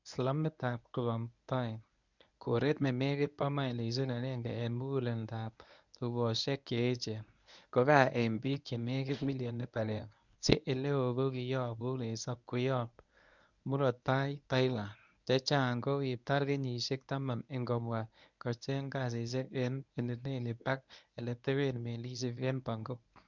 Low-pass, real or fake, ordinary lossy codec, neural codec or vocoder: 7.2 kHz; fake; none; codec, 24 kHz, 0.9 kbps, WavTokenizer, medium speech release version 1